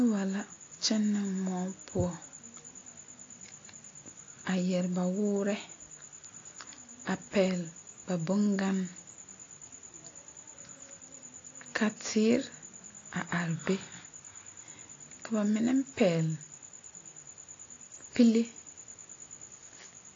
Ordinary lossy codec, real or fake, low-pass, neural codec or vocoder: AAC, 32 kbps; real; 7.2 kHz; none